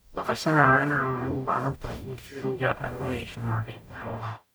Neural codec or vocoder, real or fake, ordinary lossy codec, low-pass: codec, 44.1 kHz, 0.9 kbps, DAC; fake; none; none